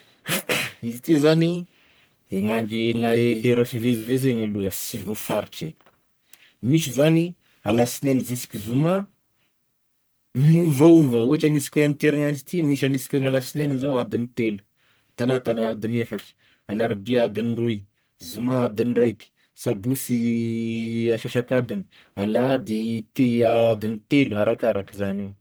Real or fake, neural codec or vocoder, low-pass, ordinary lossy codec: fake; codec, 44.1 kHz, 1.7 kbps, Pupu-Codec; none; none